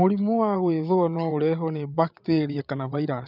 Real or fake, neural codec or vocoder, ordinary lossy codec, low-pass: fake; vocoder, 22.05 kHz, 80 mel bands, WaveNeXt; none; 5.4 kHz